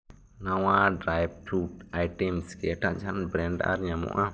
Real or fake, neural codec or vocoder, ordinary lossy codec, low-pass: real; none; none; none